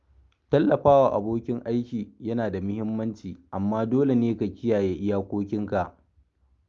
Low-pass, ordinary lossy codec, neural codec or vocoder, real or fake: 7.2 kHz; Opus, 32 kbps; none; real